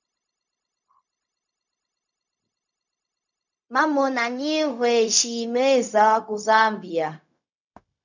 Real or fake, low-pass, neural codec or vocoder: fake; 7.2 kHz; codec, 16 kHz, 0.4 kbps, LongCat-Audio-Codec